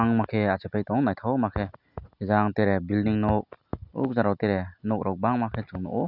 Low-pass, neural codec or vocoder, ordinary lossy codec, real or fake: 5.4 kHz; none; none; real